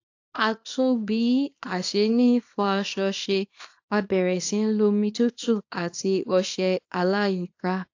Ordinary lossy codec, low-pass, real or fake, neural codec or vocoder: AAC, 48 kbps; 7.2 kHz; fake; codec, 24 kHz, 0.9 kbps, WavTokenizer, small release